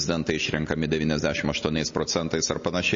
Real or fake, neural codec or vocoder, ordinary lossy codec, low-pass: real; none; MP3, 32 kbps; 7.2 kHz